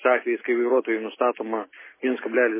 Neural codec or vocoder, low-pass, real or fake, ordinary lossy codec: none; 3.6 kHz; real; MP3, 16 kbps